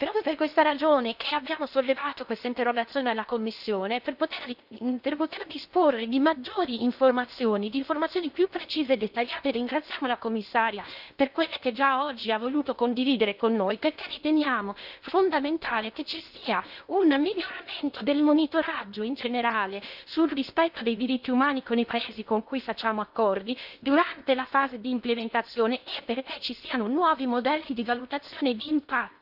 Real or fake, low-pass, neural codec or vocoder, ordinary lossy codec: fake; 5.4 kHz; codec, 16 kHz in and 24 kHz out, 0.8 kbps, FocalCodec, streaming, 65536 codes; none